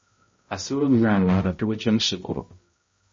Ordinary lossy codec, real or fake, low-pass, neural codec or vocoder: MP3, 32 kbps; fake; 7.2 kHz; codec, 16 kHz, 0.5 kbps, X-Codec, HuBERT features, trained on balanced general audio